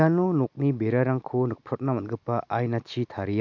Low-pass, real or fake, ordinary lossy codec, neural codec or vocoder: 7.2 kHz; real; none; none